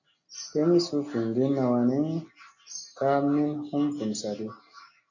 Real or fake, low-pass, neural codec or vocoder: real; 7.2 kHz; none